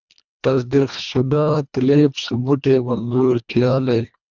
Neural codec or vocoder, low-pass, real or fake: codec, 24 kHz, 1.5 kbps, HILCodec; 7.2 kHz; fake